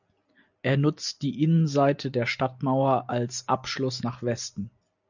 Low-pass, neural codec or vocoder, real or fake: 7.2 kHz; none; real